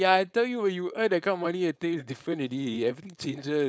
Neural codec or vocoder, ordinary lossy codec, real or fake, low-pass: codec, 16 kHz, 4.8 kbps, FACodec; none; fake; none